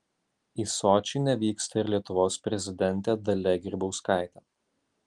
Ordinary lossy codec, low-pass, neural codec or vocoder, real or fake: Opus, 24 kbps; 10.8 kHz; none; real